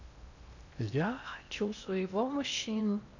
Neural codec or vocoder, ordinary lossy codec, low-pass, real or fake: codec, 16 kHz in and 24 kHz out, 0.8 kbps, FocalCodec, streaming, 65536 codes; none; 7.2 kHz; fake